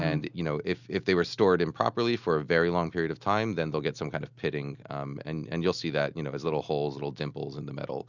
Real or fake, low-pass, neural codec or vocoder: real; 7.2 kHz; none